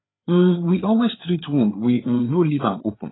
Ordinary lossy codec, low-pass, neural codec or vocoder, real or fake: AAC, 16 kbps; 7.2 kHz; codec, 16 kHz, 4 kbps, FreqCodec, larger model; fake